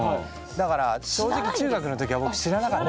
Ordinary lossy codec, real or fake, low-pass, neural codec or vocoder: none; real; none; none